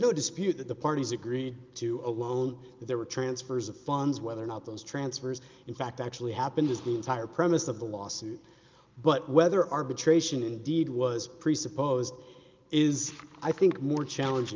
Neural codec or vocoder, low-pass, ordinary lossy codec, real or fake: none; 7.2 kHz; Opus, 24 kbps; real